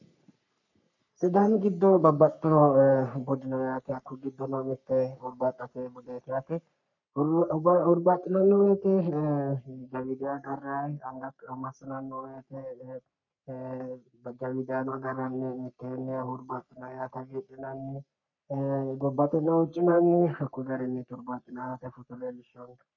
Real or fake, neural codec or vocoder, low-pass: fake; codec, 44.1 kHz, 3.4 kbps, Pupu-Codec; 7.2 kHz